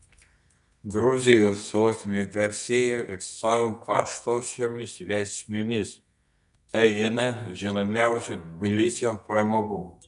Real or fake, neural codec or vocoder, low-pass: fake; codec, 24 kHz, 0.9 kbps, WavTokenizer, medium music audio release; 10.8 kHz